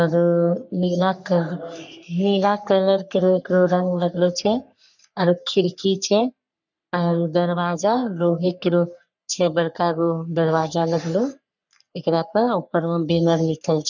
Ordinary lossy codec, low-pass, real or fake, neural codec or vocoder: none; 7.2 kHz; fake; codec, 44.1 kHz, 3.4 kbps, Pupu-Codec